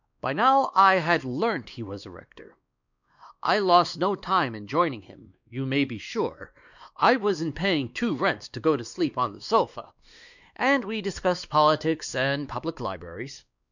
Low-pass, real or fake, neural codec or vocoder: 7.2 kHz; fake; codec, 16 kHz, 2 kbps, X-Codec, WavLM features, trained on Multilingual LibriSpeech